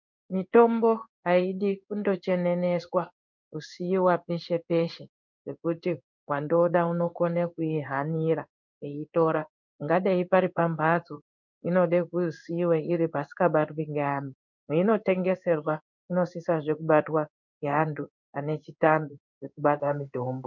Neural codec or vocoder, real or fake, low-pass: codec, 16 kHz in and 24 kHz out, 1 kbps, XY-Tokenizer; fake; 7.2 kHz